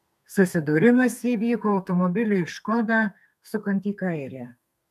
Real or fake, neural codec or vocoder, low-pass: fake; codec, 32 kHz, 1.9 kbps, SNAC; 14.4 kHz